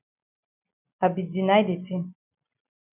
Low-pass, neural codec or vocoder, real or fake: 3.6 kHz; none; real